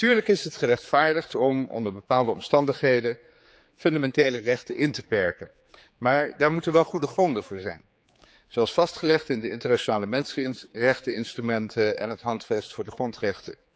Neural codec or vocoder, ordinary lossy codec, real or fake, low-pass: codec, 16 kHz, 4 kbps, X-Codec, HuBERT features, trained on general audio; none; fake; none